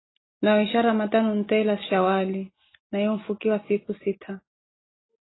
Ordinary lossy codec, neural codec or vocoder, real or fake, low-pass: AAC, 16 kbps; none; real; 7.2 kHz